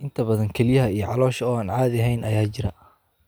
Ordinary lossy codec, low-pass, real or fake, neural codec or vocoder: none; none; real; none